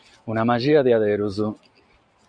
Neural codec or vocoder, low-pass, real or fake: none; 9.9 kHz; real